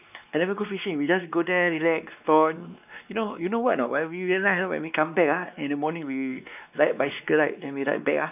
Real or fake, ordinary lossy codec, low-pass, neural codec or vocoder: fake; none; 3.6 kHz; codec, 16 kHz, 2 kbps, X-Codec, WavLM features, trained on Multilingual LibriSpeech